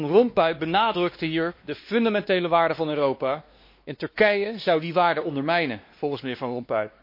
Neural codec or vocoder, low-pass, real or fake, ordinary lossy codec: codec, 16 kHz, 2 kbps, X-Codec, WavLM features, trained on Multilingual LibriSpeech; 5.4 kHz; fake; MP3, 32 kbps